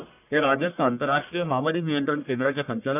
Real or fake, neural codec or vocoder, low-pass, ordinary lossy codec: fake; codec, 44.1 kHz, 1.7 kbps, Pupu-Codec; 3.6 kHz; none